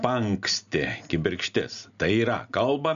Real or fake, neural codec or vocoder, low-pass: real; none; 7.2 kHz